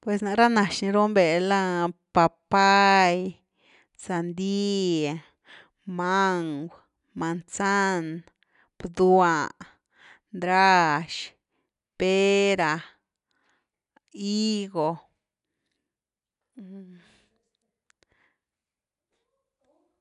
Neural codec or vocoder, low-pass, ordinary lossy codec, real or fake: none; 10.8 kHz; none; real